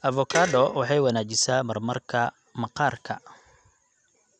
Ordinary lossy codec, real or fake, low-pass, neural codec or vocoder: none; real; 9.9 kHz; none